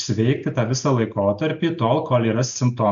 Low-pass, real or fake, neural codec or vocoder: 7.2 kHz; real; none